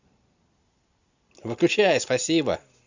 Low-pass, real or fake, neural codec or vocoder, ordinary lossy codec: 7.2 kHz; real; none; Opus, 64 kbps